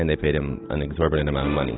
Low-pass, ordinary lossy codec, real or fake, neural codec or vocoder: 7.2 kHz; AAC, 16 kbps; real; none